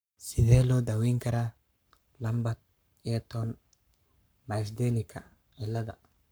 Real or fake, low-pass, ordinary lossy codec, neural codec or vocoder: fake; none; none; codec, 44.1 kHz, 7.8 kbps, Pupu-Codec